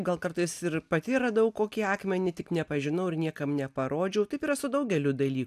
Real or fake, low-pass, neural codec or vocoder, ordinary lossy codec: real; 14.4 kHz; none; AAC, 96 kbps